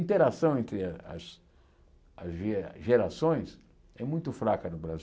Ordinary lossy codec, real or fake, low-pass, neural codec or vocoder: none; real; none; none